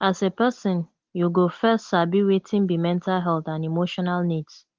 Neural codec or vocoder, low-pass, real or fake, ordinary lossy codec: none; 7.2 kHz; real; Opus, 16 kbps